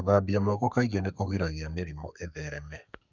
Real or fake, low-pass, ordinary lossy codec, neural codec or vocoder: fake; 7.2 kHz; none; codec, 32 kHz, 1.9 kbps, SNAC